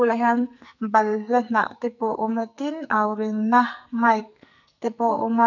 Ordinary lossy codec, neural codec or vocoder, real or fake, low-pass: none; codec, 44.1 kHz, 2.6 kbps, SNAC; fake; 7.2 kHz